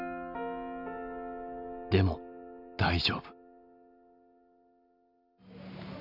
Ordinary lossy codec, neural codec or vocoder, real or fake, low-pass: none; none; real; 5.4 kHz